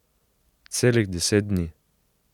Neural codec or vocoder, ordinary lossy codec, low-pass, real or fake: none; none; 19.8 kHz; real